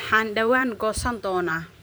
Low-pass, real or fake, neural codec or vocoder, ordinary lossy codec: none; fake; vocoder, 44.1 kHz, 128 mel bands every 512 samples, BigVGAN v2; none